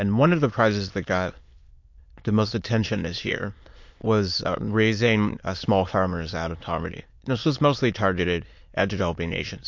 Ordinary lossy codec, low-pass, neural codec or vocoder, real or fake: MP3, 48 kbps; 7.2 kHz; autoencoder, 22.05 kHz, a latent of 192 numbers a frame, VITS, trained on many speakers; fake